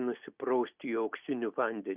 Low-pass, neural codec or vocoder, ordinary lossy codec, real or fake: 3.6 kHz; none; Opus, 64 kbps; real